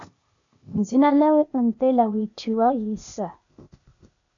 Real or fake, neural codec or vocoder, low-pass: fake; codec, 16 kHz, 0.8 kbps, ZipCodec; 7.2 kHz